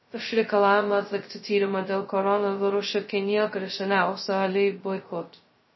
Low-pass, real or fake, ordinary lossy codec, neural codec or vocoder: 7.2 kHz; fake; MP3, 24 kbps; codec, 16 kHz, 0.2 kbps, FocalCodec